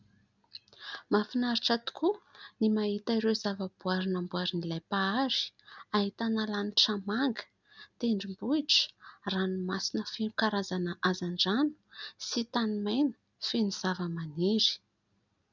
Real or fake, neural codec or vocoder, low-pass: real; none; 7.2 kHz